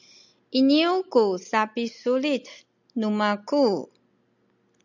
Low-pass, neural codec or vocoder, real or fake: 7.2 kHz; none; real